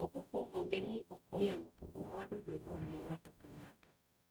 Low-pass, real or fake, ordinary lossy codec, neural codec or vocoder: none; fake; none; codec, 44.1 kHz, 0.9 kbps, DAC